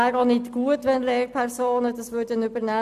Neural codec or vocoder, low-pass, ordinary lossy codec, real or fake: none; 14.4 kHz; none; real